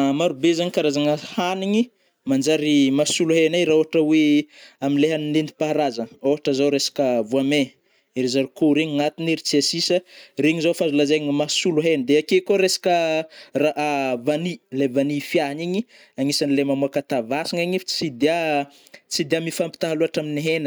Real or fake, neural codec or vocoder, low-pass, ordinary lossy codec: real; none; none; none